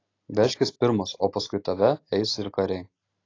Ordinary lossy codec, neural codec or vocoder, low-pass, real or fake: AAC, 32 kbps; none; 7.2 kHz; real